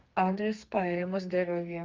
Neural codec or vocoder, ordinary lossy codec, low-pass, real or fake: codec, 32 kHz, 1.9 kbps, SNAC; Opus, 24 kbps; 7.2 kHz; fake